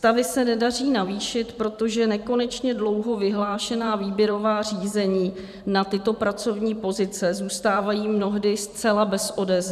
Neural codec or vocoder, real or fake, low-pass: vocoder, 44.1 kHz, 128 mel bands every 512 samples, BigVGAN v2; fake; 14.4 kHz